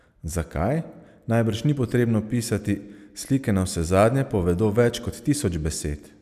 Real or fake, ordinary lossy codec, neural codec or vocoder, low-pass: real; none; none; 14.4 kHz